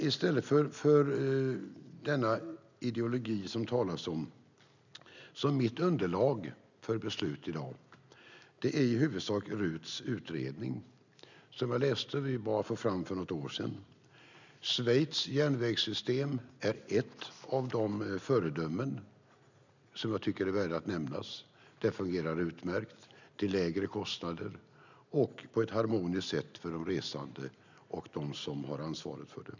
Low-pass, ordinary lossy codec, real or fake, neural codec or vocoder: 7.2 kHz; none; real; none